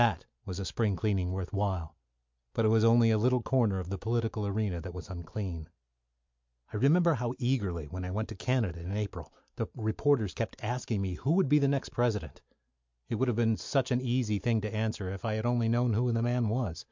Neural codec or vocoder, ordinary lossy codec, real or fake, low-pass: none; MP3, 64 kbps; real; 7.2 kHz